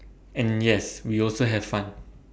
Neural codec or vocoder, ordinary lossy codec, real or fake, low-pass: none; none; real; none